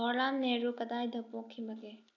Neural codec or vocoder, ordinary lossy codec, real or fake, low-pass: none; none; real; 7.2 kHz